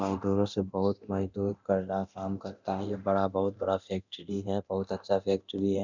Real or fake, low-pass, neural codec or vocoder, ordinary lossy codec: fake; 7.2 kHz; codec, 24 kHz, 0.9 kbps, DualCodec; none